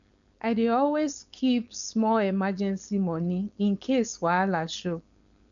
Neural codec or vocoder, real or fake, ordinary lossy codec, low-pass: codec, 16 kHz, 4.8 kbps, FACodec; fake; none; 7.2 kHz